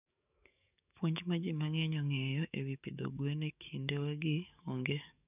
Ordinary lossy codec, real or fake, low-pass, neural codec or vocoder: none; fake; 3.6 kHz; codec, 44.1 kHz, 7.8 kbps, DAC